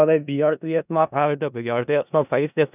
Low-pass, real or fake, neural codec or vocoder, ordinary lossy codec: 3.6 kHz; fake; codec, 16 kHz in and 24 kHz out, 0.4 kbps, LongCat-Audio-Codec, four codebook decoder; none